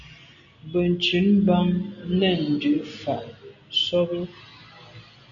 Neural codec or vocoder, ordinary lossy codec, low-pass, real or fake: none; MP3, 96 kbps; 7.2 kHz; real